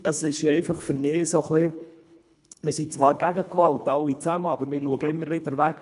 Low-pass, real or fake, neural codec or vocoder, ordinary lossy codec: 10.8 kHz; fake; codec, 24 kHz, 1.5 kbps, HILCodec; none